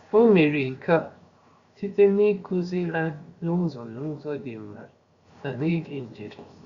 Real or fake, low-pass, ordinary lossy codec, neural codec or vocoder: fake; 7.2 kHz; Opus, 64 kbps; codec, 16 kHz, 0.7 kbps, FocalCodec